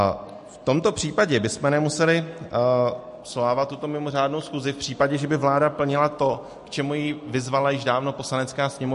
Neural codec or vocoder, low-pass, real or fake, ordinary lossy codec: none; 14.4 kHz; real; MP3, 48 kbps